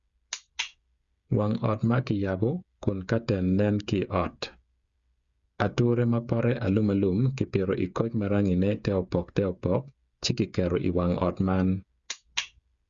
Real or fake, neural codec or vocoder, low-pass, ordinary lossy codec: fake; codec, 16 kHz, 8 kbps, FreqCodec, smaller model; 7.2 kHz; Opus, 64 kbps